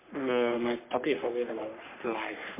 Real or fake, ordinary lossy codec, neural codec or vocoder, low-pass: fake; MP3, 24 kbps; codec, 44.1 kHz, 3.4 kbps, Pupu-Codec; 3.6 kHz